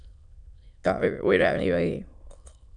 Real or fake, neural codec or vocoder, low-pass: fake; autoencoder, 22.05 kHz, a latent of 192 numbers a frame, VITS, trained on many speakers; 9.9 kHz